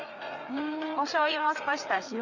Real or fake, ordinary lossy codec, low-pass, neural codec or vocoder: fake; none; 7.2 kHz; codec, 16 kHz, 4 kbps, FreqCodec, larger model